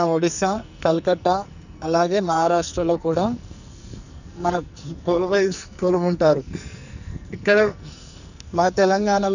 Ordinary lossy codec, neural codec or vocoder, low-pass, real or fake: none; codec, 44.1 kHz, 2.6 kbps, SNAC; 7.2 kHz; fake